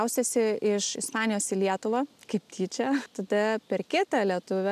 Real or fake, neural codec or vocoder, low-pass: real; none; 14.4 kHz